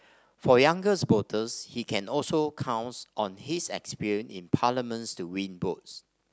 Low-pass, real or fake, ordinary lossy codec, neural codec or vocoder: none; real; none; none